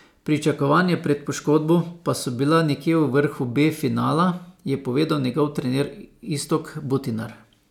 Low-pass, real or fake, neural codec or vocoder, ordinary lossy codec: 19.8 kHz; real; none; none